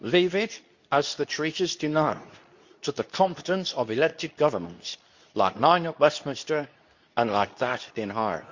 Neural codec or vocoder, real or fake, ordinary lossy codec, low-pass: codec, 24 kHz, 0.9 kbps, WavTokenizer, medium speech release version 2; fake; Opus, 64 kbps; 7.2 kHz